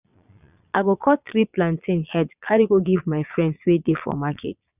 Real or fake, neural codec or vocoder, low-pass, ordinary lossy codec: fake; vocoder, 22.05 kHz, 80 mel bands, Vocos; 3.6 kHz; none